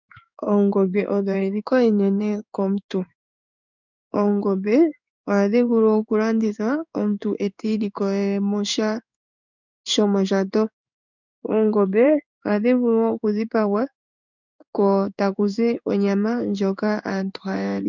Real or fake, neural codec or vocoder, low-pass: fake; codec, 16 kHz in and 24 kHz out, 1 kbps, XY-Tokenizer; 7.2 kHz